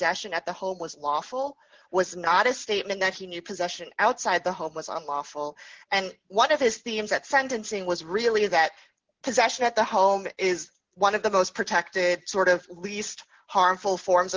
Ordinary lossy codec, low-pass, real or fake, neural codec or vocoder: Opus, 16 kbps; 7.2 kHz; real; none